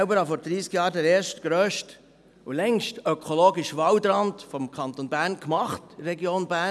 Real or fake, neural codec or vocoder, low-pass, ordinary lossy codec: real; none; none; none